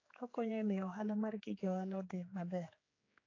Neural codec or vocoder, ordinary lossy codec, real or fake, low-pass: codec, 16 kHz, 4 kbps, X-Codec, HuBERT features, trained on general audio; AAC, 32 kbps; fake; 7.2 kHz